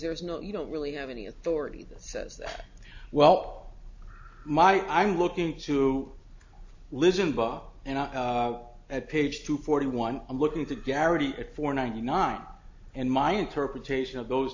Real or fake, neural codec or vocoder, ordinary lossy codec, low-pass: real; none; AAC, 48 kbps; 7.2 kHz